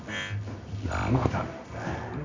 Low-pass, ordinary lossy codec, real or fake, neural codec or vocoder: 7.2 kHz; MP3, 64 kbps; fake; codec, 24 kHz, 0.9 kbps, WavTokenizer, medium music audio release